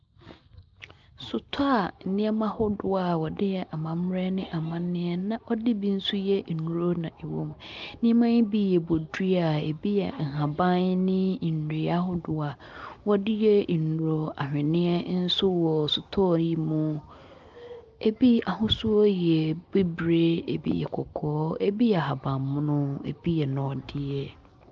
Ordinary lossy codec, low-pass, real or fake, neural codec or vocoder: Opus, 24 kbps; 7.2 kHz; real; none